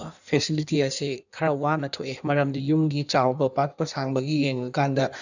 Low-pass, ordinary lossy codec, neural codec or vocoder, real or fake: 7.2 kHz; none; codec, 16 kHz in and 24 kHz out, 1.1 kbps, FireRedTTS-2 codec; fake